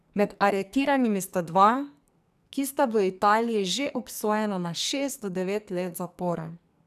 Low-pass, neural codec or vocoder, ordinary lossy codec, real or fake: 14.4 kHz; codec, 44.1 kHz, 2.6 kbps, SNAC; none; fake